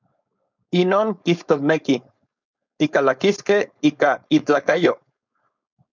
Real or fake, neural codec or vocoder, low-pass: fake; codec, 16 kHz, 4.8 kbps, FACodec; 7.2 kHz